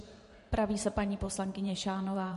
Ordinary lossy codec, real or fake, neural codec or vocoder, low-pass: MP3, 48 kbps; fake; vocoder, 44.1 kHz, 128 mel bands every 512 samples, BigVGAN v2; 14.4 kHz